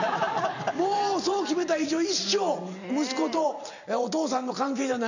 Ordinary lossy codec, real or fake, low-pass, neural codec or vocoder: none; fake; 7.2 kHz; vocoder, 44.1 kHz, 128 mel bands every 512 samples, BigVGAN v2